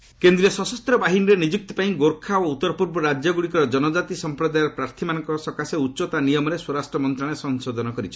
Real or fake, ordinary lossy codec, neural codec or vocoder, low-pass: real; none; none; none